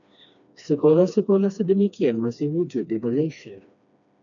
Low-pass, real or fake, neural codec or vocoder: 7.2 kHz; fake; codec, 16 kHz, 2 kbps, FreqCodec, smaller model